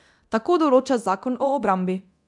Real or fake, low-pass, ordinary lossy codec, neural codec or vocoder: fake; 10.8 kHz; none; codec, 24 kHz, 0.9 kbps, DualCodec